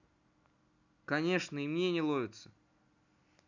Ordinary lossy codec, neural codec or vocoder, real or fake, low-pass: none; none; real; 7.2 kHz